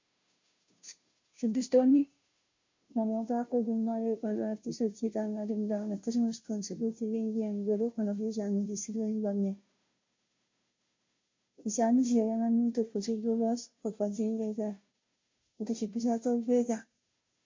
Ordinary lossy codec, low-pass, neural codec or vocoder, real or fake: MP3, 48 kbps; 7.2 kHz; codec, 16 kHz, 0.5 kbps, FunCodec, trained on Chinese and English, 25 frames a second; fake